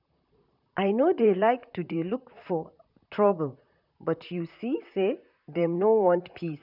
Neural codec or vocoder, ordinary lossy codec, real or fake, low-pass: vocoder, 44.1 kHz, 128 mel bands, Pupu-Vocoder; none; fake; 5.4 kHz